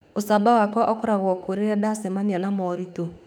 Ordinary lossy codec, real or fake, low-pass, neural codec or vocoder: none; fake; 19.8 kHz; autoencoder, 48 kHz, 32 numbers a frame, DAC-VAE, trained on Japanese speech